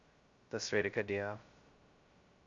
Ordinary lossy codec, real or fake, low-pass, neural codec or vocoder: Opus, 64 kbps; fake; 7.2 kHz; codec, 16 kHz, 0.2 kbps, FocalCodec